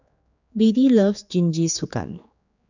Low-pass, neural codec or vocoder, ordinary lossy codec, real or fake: 7.2 kHz; codec, 16 kHz, 4 kbps, X-Codec, HuBERT features, trained on general audio; none; fake